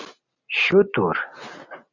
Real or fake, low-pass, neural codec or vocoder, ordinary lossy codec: real; 7.2 kHz; none; Opus, 64 kbps